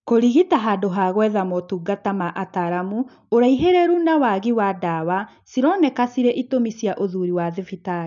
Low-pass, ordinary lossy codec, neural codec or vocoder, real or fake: 7.2 kHz; none; none; real